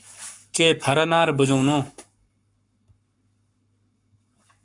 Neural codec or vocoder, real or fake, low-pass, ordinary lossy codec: codec, 44.1 kHz, 7.8 kbps, Pupu-Codec; fake; 10.8 kHz; MP3, 96 kbps